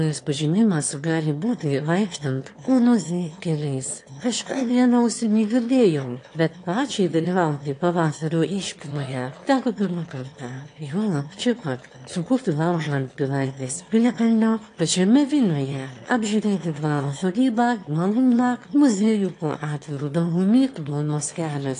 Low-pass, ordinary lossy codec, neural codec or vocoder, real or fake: 9.9 kHz; AAC, 48 kbps; autoencoder, 22.05 kHz, a latent of 192 numbers a frame, VITS, trained on one speaker; fake